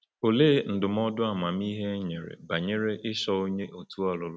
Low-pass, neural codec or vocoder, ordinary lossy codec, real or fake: none; none; none; real